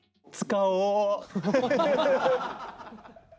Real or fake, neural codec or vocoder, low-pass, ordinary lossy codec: real; none; none; none